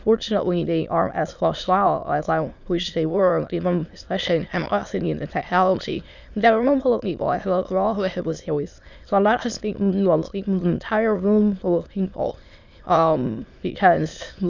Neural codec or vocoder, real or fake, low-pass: autoencoder, 22.05 kHz, a latent of 192 numbers a frame, VITS, trained on many speakers; fake; 7.2 kHz